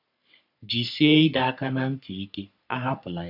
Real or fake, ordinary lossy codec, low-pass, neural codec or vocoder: fake; none; 5.4 kHz; codec, 44.1 kHz, 3.4 kbps, Pupu-Codec